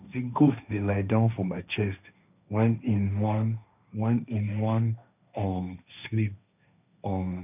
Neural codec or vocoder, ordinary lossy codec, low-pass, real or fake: codec, 16 kHz, 1.1 kbps, Voila-Tokenizer; none; 3.6 kHz; fake